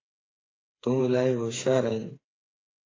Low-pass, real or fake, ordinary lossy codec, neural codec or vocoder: 7.2 kHz; fake; AAC, 32 kbps; codec, 16 kHz, 4 kbps, FreqCodec, smaller model